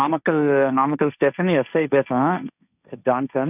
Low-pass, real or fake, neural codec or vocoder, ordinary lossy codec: 3.6 kHz; fake; codec, 16 kHz, 1.1 kbps, Voila-Tokenizer; none